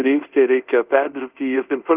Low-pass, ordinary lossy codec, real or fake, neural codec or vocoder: 3.6 kHz; Opus, 32 kbps; fake; codec, 24 kHz, 0.5 kbps, DualCodec